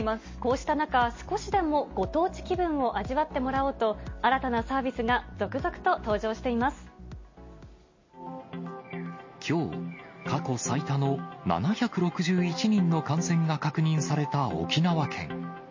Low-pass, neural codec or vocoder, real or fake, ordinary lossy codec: 7.2 kHz; none; real; MP3, 32 kbps